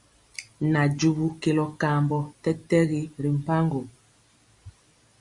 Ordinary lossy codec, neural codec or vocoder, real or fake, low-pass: Opus, 64 kbps; none; real; 10.8 kHz